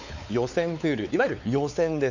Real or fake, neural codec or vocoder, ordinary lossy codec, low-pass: fake; codec, 16 kHz, 4 kbps, X-Codec, WavLM features, trained on Multilingual LibriSpeech; none; 7.2 kHz